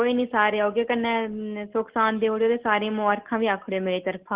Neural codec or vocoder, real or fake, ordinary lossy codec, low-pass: none; real; Opus, 16 kbps; 3.6 kHz